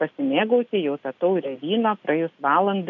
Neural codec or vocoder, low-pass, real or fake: none; 7.2 kHz; real